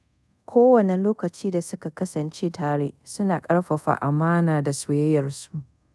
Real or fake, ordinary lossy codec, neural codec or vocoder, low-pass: fake; none; codec, 24 kHz, 0.5 kbps, DualCodec; none